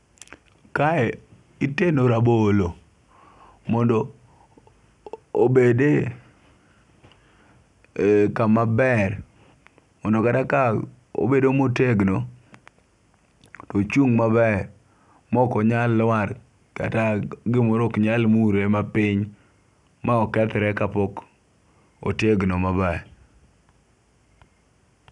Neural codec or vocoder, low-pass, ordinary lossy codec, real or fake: none; 10.8 kHz; none; real